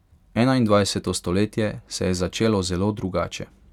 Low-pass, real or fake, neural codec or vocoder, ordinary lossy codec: 19.8 kHz; real; none; none